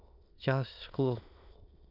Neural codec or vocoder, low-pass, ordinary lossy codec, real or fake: autoencoder, 22.05 kHz, a latent of 192 numbers a frame, VITS, trained on many speakers; 5.4 kHz; none; fake